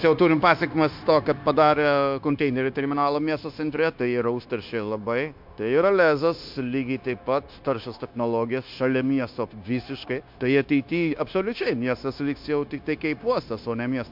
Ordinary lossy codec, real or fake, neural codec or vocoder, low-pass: MP3, 48 kbps; fake; codec, 16 kHz, 0.9 kbps, LongCat-Audio-Codec; 5.4 kHz